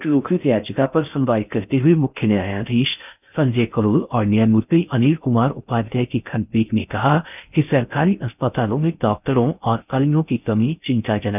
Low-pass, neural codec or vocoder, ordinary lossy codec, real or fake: 3.6 kHz; codec, 16 kHz in and 24 kHz out, 0.6 kbps, FocalCodec, streaming, 4096 codes; none; fake